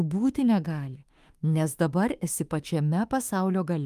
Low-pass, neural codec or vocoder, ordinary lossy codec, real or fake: 14.4 kHz; autoencoder, 48 kHz, 32 numbers a frame, DAC-VAE, trained on Japanese speech; Opus, 32 kbps; fake